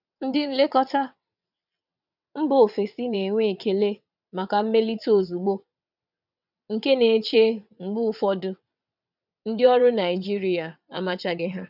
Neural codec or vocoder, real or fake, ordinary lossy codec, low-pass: vocoder, 22.05 kHz, 80 mel bands, WaveNeXt; fake; none; 5.4 kHz